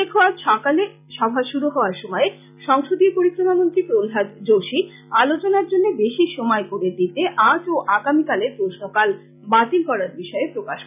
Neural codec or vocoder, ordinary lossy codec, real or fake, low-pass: none; none; real; 3.6 kHz